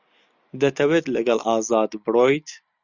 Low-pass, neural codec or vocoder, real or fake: 7.2 kHz; none; real